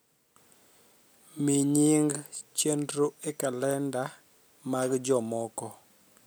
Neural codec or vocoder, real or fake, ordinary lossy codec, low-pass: none; real; none; none